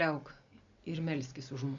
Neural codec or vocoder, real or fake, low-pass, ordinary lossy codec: none; real; 7.2 kHz; MP3, 64 kbps